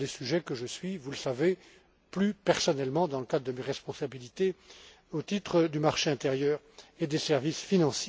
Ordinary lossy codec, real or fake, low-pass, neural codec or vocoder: none; real; none; none